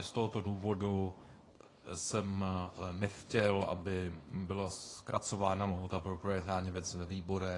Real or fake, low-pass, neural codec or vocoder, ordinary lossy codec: fake; 10.8 kHz; codec, 24 kHz, 0.9 kbps, WavTokenizer, small release; AAC, 32 kbps